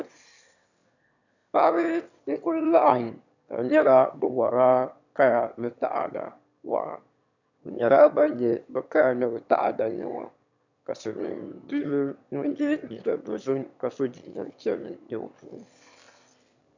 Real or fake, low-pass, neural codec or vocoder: fake; 7.2 kHz; autoencoder, 22.05 kHz, a latent of 192 numbers a frame, VITS, trained on one speaker